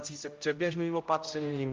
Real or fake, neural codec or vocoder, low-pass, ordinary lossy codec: fake; codec, 16 kHz, 0.5 kbps, X-Codec, HuBERT features, trained on general audio; 7.2 kHz; Opus, 24 kbps